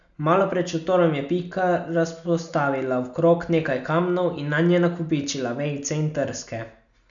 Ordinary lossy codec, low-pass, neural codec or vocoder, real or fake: none; 7.2 kHz; none; real